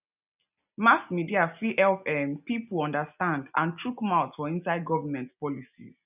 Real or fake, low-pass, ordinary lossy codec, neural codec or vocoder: real; 3.6 kHz; none; none